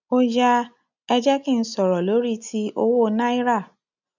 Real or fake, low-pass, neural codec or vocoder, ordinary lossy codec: real; 7.2 kHz; none; none